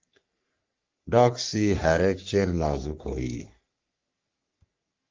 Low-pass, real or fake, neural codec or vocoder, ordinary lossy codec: 7.2 kHz; fake; codec, 44.1 kHz, 3.4 kbps, Pupu-Codec; Opus, 24 kbps